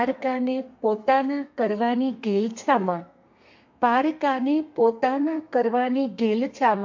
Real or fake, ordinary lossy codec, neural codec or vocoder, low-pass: fake; MP3, 48 kbps; codec, 32 kHz, 1.9 kbps, SNAC; 7.2 kHz